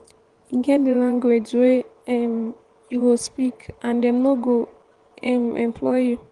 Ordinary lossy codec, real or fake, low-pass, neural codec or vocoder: Opus, 24 kbps; fake; 10.8 kHz; vocoder, 24 kHz, 100 mel bands, Vocos